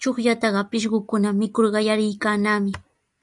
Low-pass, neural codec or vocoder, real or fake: 10.8 kHz; none; real